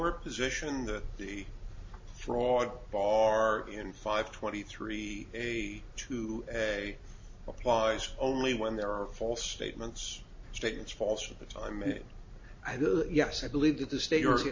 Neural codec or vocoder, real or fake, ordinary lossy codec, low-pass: none; real; MP3, 32 kbps; 7.2 kHz